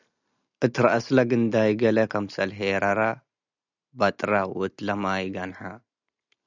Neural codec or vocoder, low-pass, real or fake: none; 7.2 kHz; real